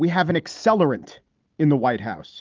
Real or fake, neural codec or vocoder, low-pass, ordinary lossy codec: real; none; 7.2 kHz; Opus, 32 kbps